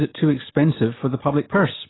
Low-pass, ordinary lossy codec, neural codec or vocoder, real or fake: 7.2 kHz; AAC, 16 kbps; none; real